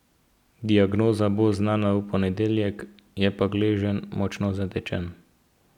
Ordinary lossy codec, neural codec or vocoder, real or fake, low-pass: none; none; real; 19.8 kHz